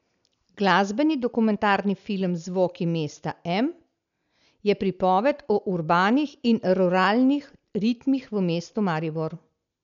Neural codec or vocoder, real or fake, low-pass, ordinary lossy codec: none; real; 7.2 kHz; none